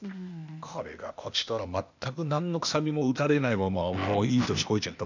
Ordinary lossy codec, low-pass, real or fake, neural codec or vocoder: none; 7.2 kHz; fake; codec, 16 kHz, 0.8 kbps, ZipCodec